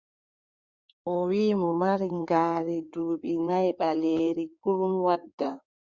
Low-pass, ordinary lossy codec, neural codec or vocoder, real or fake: 7.2 kHz; Opus, 64 kbps; codec, 16 kHz in and 24 kHz out, 2.2 kbps, FireRedTTS-2 codec; fake